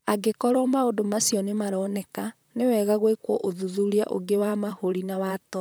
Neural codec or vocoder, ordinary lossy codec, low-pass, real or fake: vocoder, 44.1 kHz, 128 mel bands, Pupu-Vocoder; none; none; fake